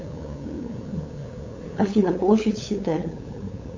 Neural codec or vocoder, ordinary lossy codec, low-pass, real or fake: codec, 16 kHz, 8 kbps, FunCodec, trained on LibriTTS, 25 frames a second; MP3, 64 kbps; 7.2 kHz; fake